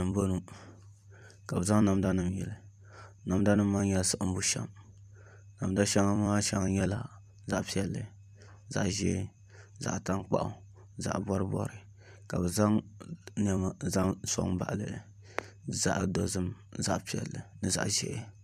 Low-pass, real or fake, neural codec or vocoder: 14.4 kHz; fake; vocoder, 44.1 kHz, 128 mel bands every 256 samples, BigVGAN v2